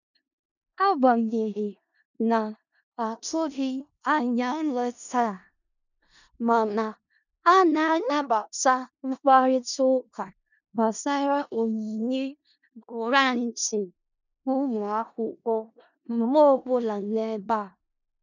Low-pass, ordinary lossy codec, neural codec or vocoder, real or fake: 7.2 kHz; none; codec, 16 kHz in and 24 kHz out, 0.4 kbps, LongCat-Audio-Codec, four codebook decoder; fake